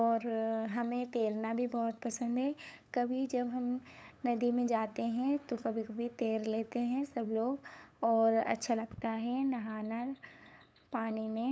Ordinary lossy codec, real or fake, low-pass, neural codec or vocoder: none; fake; none; codec, 16 kHz, 8 kbps, FunCodec, trained on LibriTTS, 25 frames a second